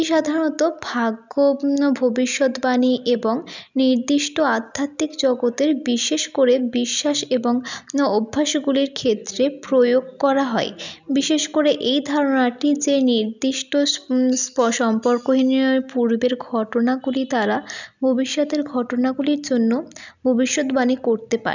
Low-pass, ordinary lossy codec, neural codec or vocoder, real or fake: 7.2 kHz; none; none; real